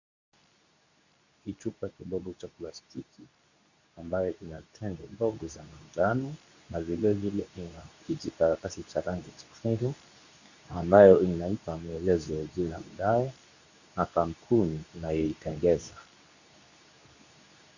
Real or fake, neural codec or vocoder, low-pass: fake; codec, 24 kHz, 0.9 kbps, WavTokenizer, medium speech release version 2; 7.2 kHz